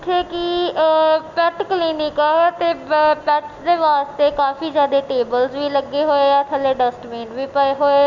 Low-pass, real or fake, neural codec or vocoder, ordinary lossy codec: 7.2 kHz; real; none; none